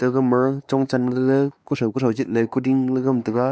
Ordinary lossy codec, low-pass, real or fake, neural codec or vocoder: none; none; fake; codec, 16 kHz, 2 kbps, X-Codec, WavLM features, trained on Multilingual LibriSpeech